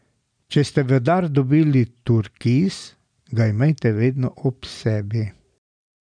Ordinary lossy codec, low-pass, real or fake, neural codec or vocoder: none; 9.9 kHz; real; none